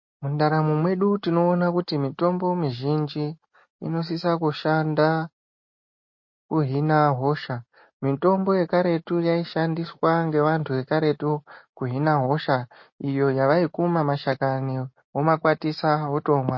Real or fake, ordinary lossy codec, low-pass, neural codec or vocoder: real; MP3, 32 kbps; 7.2 kHz; none